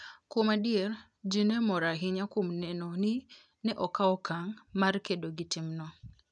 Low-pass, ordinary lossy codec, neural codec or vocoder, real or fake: 9.9 kHz; none; none; real